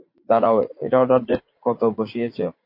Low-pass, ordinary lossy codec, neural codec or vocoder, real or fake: 5.4 kHz; MP3, 32 kbps; vocoder, 44.1 kHz, 80 mel bands, Vocos; fake